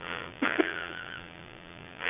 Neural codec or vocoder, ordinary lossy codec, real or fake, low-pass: vocoder, 22.05 kHz, 80 mel bands, Vocos; none; fake; 3.6 kHz